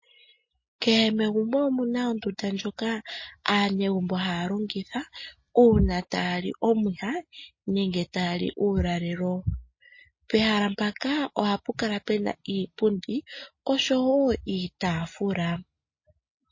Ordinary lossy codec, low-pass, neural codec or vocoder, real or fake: MP3, 32 kbps; 7.2 kHz; none; real